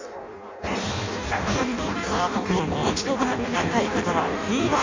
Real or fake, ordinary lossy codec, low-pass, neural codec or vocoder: fake; MP3, 48 kbps; 7.2 kHz; codec, 16 kHz in and 24 kHz out, 0.6 kbps, FireRedTTS-2 codec